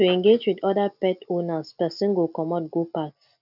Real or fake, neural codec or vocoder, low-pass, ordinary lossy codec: real; none; 5.4 kHz; none